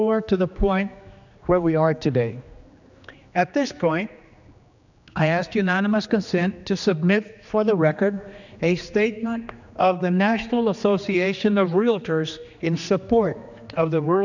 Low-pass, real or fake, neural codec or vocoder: 7.2 kHz; fake; codec, 16 kHz, 2 kbps, X-Codec, HuBERT features, trained on general audio